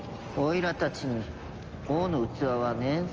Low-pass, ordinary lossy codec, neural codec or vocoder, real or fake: 7.2 kHz; Opus, 24 kbps; none; real